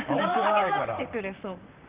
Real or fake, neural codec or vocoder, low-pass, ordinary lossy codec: fake; vocoder, 44.1 kHz, 128 mel bands, Pupu-Vocoder; 3.6 kHz; Opus, 32 kbps